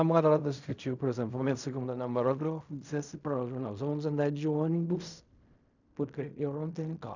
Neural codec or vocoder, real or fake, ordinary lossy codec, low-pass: codec, 16 kHz in and 24 kHz out, 0.4 kbps, LongCat-Audio-Codec, fine tuned four codebook decoder; fake; none; 7.2 kHz